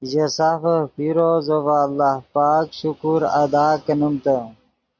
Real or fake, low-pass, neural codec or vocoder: real; 7.2 kHz; none